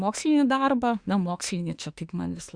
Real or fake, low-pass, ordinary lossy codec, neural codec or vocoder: fake; 9.9 kHz; Opus, 64 kbps; autoencoder, 48 kHz, 32 numbers a frame, DAC-VAE, trained on Japanese speech